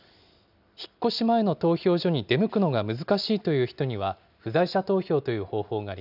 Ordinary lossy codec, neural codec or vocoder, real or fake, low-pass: none; none; real; 5.4 kHz